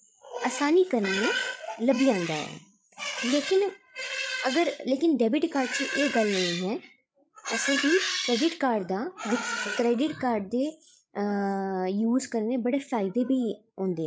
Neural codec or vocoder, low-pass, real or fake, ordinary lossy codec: codec, 16 kHz, 8 kbps, FreqCodec, larger model; none; fake; none